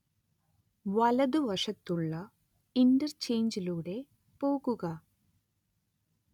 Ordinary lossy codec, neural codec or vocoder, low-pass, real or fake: none; none; 19.8 kHz; real